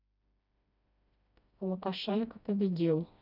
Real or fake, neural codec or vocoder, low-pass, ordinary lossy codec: fake; codec, 16 kHz, 1 kbps, FreqCodec, smaller model; 5.4 kHz; none